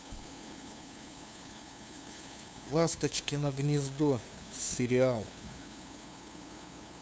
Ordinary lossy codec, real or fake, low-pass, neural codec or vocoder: none; fake; none; codec, 16 kHz, 2 kbps, FunCodec, trained on LibriTTS, 25 frames a second